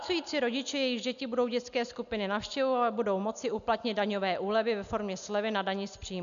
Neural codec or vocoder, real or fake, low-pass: none; real; 7.2 kHz